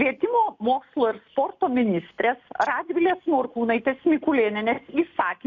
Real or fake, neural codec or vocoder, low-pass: real; none; 7.2 kHz